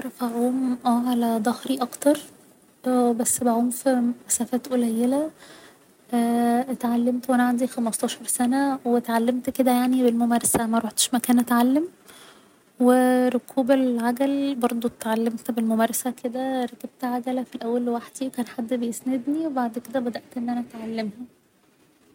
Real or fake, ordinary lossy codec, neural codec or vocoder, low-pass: real; none; none; 19.8 kHz